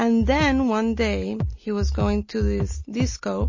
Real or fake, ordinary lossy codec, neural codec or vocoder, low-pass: real; MP3, 32 kbps; none; 7.2 kHz